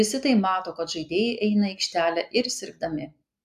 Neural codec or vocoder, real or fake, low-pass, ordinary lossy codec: none; real; 14.4 kHz; AAC, 96 kbps